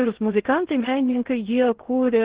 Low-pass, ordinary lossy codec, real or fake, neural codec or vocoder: 3.6 kHz; Opus, 16 kbps; fake; codec, 16 kHz in and 24 kHz out, 0.6 kbps, FocalCodec, streaming, 2048 codes